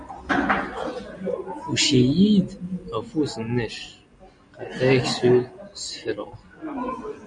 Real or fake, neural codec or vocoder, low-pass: real; none; 9.9 kHz